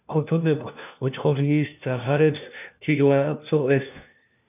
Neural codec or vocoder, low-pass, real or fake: codec, 16 kHz, 1 kbps, FunCodec, trained on LibriTTS, 50 frames a second; 3.6 kHz; fake